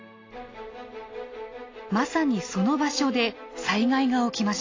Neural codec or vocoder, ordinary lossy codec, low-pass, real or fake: none; AAC, 32 kbps; 7.2 kHz; real